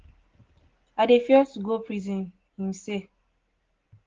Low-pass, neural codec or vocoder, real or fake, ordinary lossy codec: 7.2 kHz; none; real; Opus, 16 kbps